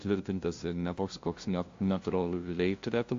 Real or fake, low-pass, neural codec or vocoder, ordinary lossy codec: fake; 7.2 kHz; codec, 16 kHz, 0.5 kbps, FunCodec, trained on LibriTTS, 25 frames a second; AAC, 48 kbps